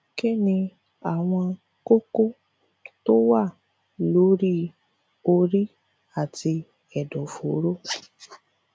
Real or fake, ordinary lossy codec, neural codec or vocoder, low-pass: real; none; none; none